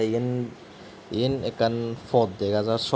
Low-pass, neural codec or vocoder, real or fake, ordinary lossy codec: none; none; real; none